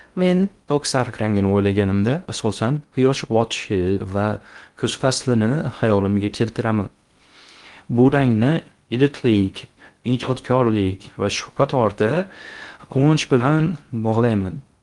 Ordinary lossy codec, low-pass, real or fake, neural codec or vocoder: Opus, 32 kbps; 10.8 kHz; fake; codec, 16 kHz in and 24 kHz out, 0.6 kbps, FocalCodec, streaming, 2048 codes